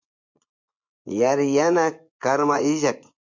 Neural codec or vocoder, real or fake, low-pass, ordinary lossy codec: vocoder, 24 kHz, 100 mel bands, Vocos; fake; 7.2 kHz; MP3, 64 kbps